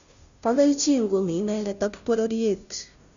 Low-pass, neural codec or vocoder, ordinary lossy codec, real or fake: 7.2 kHz; codec, 16 kHz, 0.5 kbps, FunCodec, trained on Chinese and English, 25 frames a second; none; fake